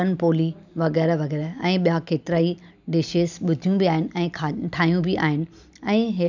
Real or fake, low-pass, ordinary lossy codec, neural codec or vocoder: real; 7.2 kHz; none; none